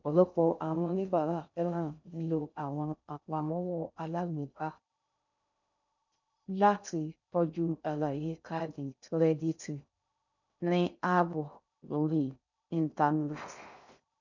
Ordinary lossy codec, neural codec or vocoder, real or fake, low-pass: none; codec, 16 kHz in and 24 kHz out, 0.6 kbps, FocalCodec, streaming, 2048 codes; fake; 7.2 kHz